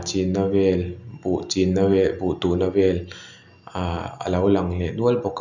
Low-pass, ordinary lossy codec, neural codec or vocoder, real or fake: 7.2 kHz; none; none; real